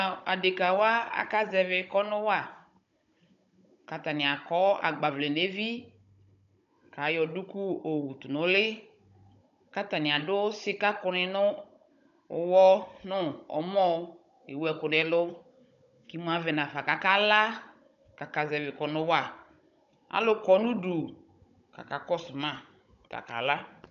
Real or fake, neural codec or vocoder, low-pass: fake; codec, 16 kHz, 16 kbps, FunCodec, trained on Chinese and English, 50 frames a second; 7.2 kHz